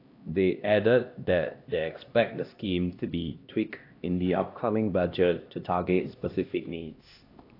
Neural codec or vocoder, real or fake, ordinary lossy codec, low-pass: codec, 16 kHz, 1 kbps, X-Codec, HuBERT features, trained on LibriSpeech; fake; AAC, 32 kbps; 5.4 kHz